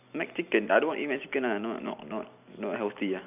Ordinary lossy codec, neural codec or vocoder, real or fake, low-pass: none; vocoder, 44.1 kHz, 128 mel bands every 256 samples, BigVGAN v2; fake; 3.6 kHz